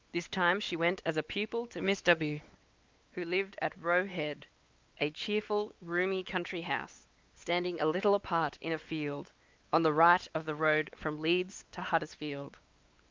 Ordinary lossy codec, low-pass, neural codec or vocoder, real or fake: Opus, 24 kbps; 7.2 kHz; codec, 16 kHz, 4 kbps, X-Codec, WavLM features, trained on Multilingual LibriSpeech; fake